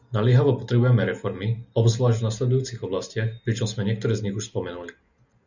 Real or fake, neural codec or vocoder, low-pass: real; none; 7.2 kHz